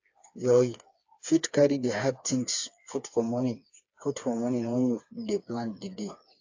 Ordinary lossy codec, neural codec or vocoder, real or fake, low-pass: AAC, 48 kbps; codec, 16 kHz, 4 kbps, FreqCodec, smaller model; fake; 7.2 kHz